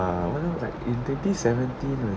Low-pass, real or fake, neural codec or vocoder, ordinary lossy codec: none; real; none; none